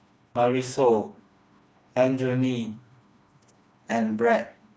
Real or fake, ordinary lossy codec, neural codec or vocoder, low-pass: fake; none; codec, 16 kHz, 2 kbps, FreqCodec, smaller model; none